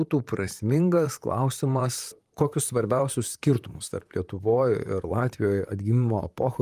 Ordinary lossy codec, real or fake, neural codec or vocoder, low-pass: Opus, 32 kbps; fake; vocoder, 44.1 kHz, 128 mel bands, Pupu-Vocoder; 14.4 kHz